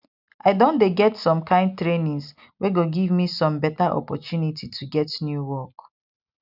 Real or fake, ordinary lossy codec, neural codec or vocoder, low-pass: real; none; none; 5.4 kHz